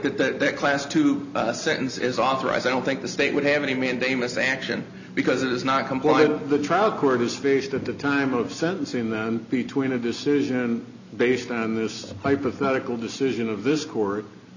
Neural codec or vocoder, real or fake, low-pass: none; real; 7.2 kHz